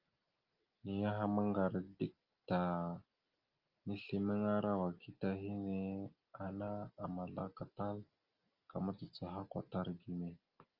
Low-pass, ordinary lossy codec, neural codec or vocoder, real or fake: 5.4 kHz; Opus, 24 kbps; none; real